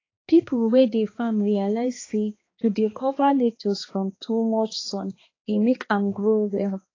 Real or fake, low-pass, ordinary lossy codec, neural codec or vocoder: fake; 7.2 kHz; AAC, 32 kbps; codec, 16 kHz, 2 kbps, X-Codec, HuBERT features, trained on balanced general audio